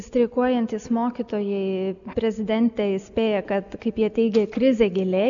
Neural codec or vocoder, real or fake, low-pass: none; real; 7.2 kHz